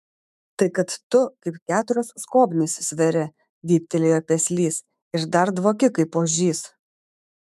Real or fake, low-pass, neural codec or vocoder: fake; 14.4 kHz; autoencoder, 48 kHz, 128 numbers a frame, DAC-VAE, trained on Japanese speech